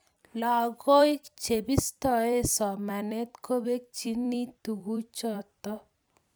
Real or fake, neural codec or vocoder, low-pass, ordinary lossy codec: fake; vocoder, 44.1 kHz, 128 mel bands every 256 samples, BigVGAN v2; none; none